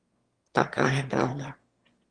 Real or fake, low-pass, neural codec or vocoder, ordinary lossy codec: fake; 9.9 kHz; autoencoder, 22.05 kHz, a latent of 192 numbers a frame, VITS, trained on one speaker; Opus, 24 kbps